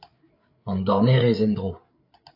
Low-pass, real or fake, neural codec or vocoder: 5.4 kHz; fake; codec, 16 kHz, 8 kbps, FreqCodec, larger model